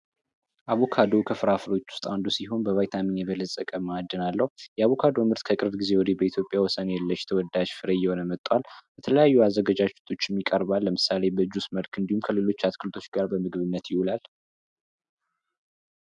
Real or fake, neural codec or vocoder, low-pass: real; none; 10.8 kHz